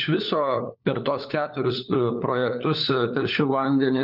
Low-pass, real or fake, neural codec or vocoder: 5.4 kHz; fake; codec, 16 kHz, 4 kbps, FunCodec, trained on LibriTTS, 50 frames a second